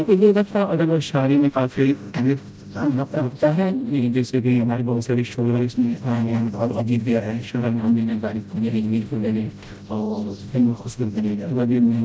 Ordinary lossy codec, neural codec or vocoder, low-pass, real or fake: none; codec, 16 kHz, 0.5 kbps, FreqCodec, smaller model; none; fake